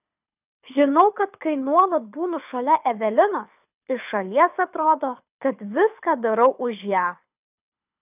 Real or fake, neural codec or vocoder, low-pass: fake; codec, 24 kHz, 6 kbps, HILCodec; 3.6 kHz